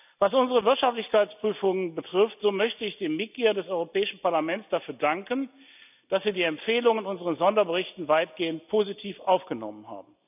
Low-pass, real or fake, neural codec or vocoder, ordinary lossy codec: 3.6 kHz; real; none; none